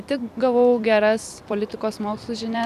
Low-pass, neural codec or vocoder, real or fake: 14.4 kHz; vocoder, 44.1 kHz, 128 mel bands every 256 samples, BigVGAN v2; fake